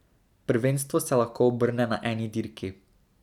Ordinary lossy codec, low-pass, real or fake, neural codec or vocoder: none; 19.8 kHz; real; none